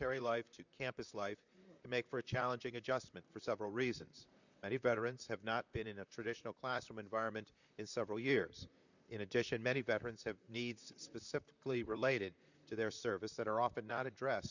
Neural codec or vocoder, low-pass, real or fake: vocoder, 44.1 kHz, 128 mel bands, Pupu-Vocoder; 7.2 kHz; fake